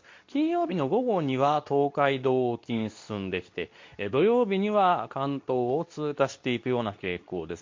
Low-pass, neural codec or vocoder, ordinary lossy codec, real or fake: 7.2 kHz; codec, 24 kHz, 0.9 kbps, WavTokenizer, medium speech release version 2; MP3, 48 kbps; fake